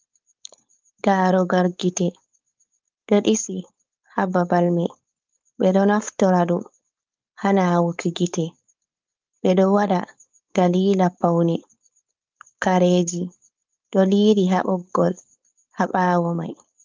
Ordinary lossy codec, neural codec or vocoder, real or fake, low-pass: Opus, 24 kbps; codec, 16 kHz, 4.8 kbps, FACodec; fake; 7.2 kHz